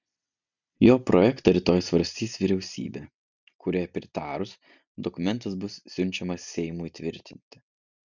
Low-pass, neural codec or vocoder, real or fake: 7.2 kHz; none; real